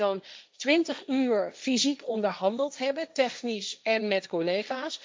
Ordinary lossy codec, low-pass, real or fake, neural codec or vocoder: none; none; fake; codec, 16 kHz, 1.1 kbps, Voila-Tokenizer